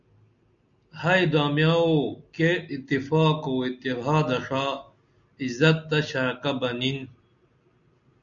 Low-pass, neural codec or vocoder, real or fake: 7.2 kHz; none; real